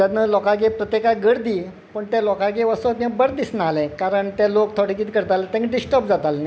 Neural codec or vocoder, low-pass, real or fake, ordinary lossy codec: none; none; real; none